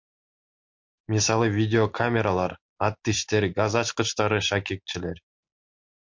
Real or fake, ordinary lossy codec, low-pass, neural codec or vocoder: real; MP3, 48 kbps; 7.2 kHz; none